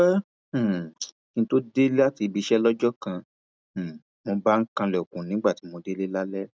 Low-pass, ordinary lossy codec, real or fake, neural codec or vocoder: none; none; real; none